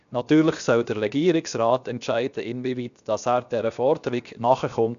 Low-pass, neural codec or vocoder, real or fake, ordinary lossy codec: 7.2 kHz; codec, 16 kHz, 0.7 kbps, FocalCodec; fake; none